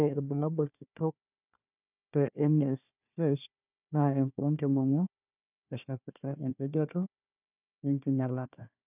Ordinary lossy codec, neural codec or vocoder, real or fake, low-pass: none; codec, 16 kHz, 1 kbps, FunCodec, trained on Chinese and English, 50 frames a second; fake; 3.6 kHz